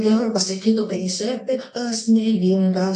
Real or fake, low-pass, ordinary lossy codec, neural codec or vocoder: fake; 10.8 kHz; AAC, 48 kbps; codec, 24 kHz, 0.9 kbps, WavTokenizer, medium music audio release